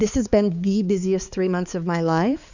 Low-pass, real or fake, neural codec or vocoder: 7.2 kHz; fake; codec, 16 kHz, 4 kbps, X-Codec, HuBERT features, trained on balanced general audio